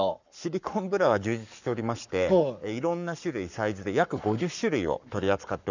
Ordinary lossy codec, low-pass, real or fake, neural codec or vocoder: none; 7.2 kHz; fake; codec, 44.1 kHz, 7.8 kbps, Pupu-Codec